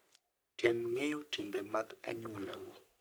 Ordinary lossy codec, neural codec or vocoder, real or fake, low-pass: none; codec, 44.1 kHz, 3.4 kbps, Pupu-Codec; fake; none